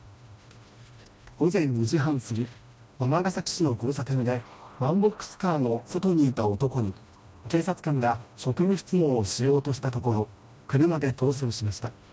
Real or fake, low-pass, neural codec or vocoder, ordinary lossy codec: fake; none; codec, 16 kHz, 1 kbps, FreqCodec, smaller model; none